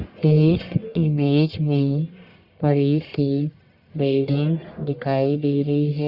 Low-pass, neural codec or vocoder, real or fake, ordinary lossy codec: 5.4 kHz; codec, 44.1 kHz, 1.7 kbps, Pupu-Codec; fake; Opus, 64 kbps